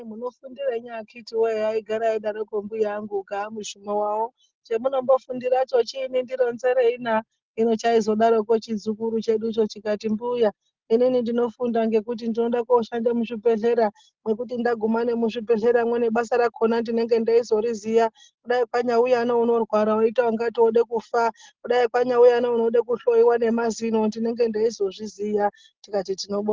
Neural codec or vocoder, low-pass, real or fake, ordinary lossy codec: none; 7.2 kHz; real; Opus, 16 kbps